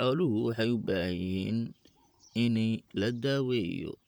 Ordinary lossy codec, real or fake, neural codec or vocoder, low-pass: none; fake; vocoder, 44.1 kHz, 128 mel bands, Pupu-Vocoder; none